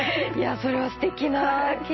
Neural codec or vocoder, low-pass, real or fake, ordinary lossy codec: none; 7.2 kHz; real; MP3, 24 kbps